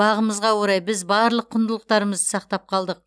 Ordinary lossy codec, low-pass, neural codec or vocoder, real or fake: none; none; none; real